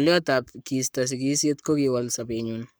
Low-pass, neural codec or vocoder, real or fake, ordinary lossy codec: none; codec, 44.1 kHz, 7.8 kbps, Pupu-Codec; fake; none